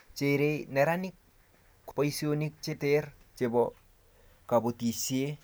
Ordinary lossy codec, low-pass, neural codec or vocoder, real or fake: none; none; none; real